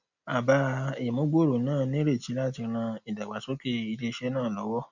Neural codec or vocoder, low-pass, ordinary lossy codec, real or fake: none; 7.2 kHz; none; real